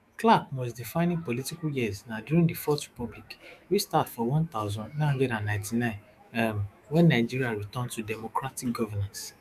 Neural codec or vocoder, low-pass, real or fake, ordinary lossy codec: autoencoder, 48 kHz, 128 numbers a frame, DAC-VAE, trained on Japanese speech; 14.4 kHz; fake; none